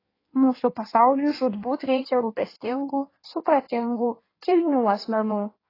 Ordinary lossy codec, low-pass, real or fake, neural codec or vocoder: AAC, 24 kbps; 5.4 kHz; fake; codec, 16 kHz in and 24 kHz out, 1.1 kbps, FireRedTTS-2 codec